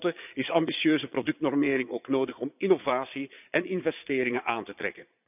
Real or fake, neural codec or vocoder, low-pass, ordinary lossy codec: fake; vocoder, 22.05 kHz, 80 mel bands, Vocos; 3.6 kHz; none